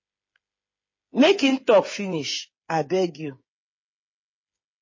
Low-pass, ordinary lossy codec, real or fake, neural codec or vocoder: 7.2 kHz; MP3, 32 kbps; fake; codec, 16 kHz, 8 kbps, FreqCodec, smaller model